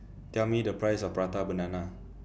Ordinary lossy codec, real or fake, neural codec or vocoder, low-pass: none; real; none; none